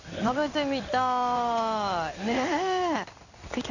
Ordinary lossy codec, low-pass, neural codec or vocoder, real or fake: none; 7.2 kHz; codec, 16 kHz in and 24 kHz out, 1 kbps, XY-Tokenizer; fake